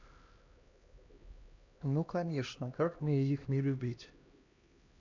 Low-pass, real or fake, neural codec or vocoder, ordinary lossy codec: 7.2 kHz; fake; codec, 16 kHz, 1 kbps, X-Codec, HuBERT features, trained on LibriSpeech; AAC, 48 kbps